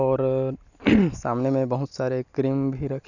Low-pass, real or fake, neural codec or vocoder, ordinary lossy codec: 7.2 kHz; real; none; none